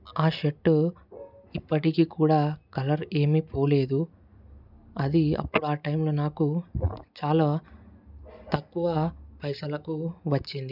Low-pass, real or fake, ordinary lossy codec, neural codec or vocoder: 5.4 kHz; real; none; none